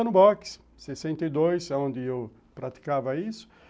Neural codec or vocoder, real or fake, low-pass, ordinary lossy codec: none; real; none; none